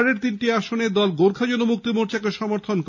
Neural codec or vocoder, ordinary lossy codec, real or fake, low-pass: none; none; real; 7.2 kHz